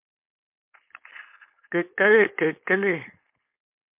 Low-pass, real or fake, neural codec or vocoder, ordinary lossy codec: 3.6 kHz; fake; codec, 16 kHz, 4.8 kbps, FACodec; MP3, 32 kbps